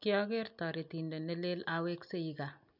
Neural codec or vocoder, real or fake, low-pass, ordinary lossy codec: none; real; 5.4 kHz; none